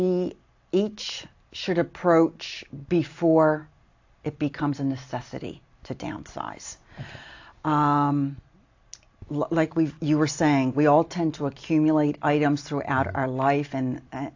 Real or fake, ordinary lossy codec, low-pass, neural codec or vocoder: real; AAC, 48 kbps; 7.2 kHz; none